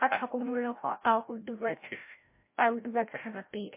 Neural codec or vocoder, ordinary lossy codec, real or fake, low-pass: codec, 16 kHz, 0.5 kbps, FreqCodec, larger model; MP3, 24 kbps; fake; 3.6 kHz